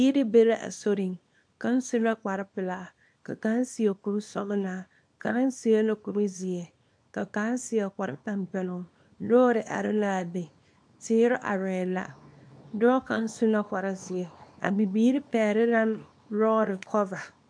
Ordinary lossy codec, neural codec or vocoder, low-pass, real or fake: MP3, 64 kbps; codec, 24 kHz, 0.9 kbps, WavTokenizer, small release; 9.9 kHz; fake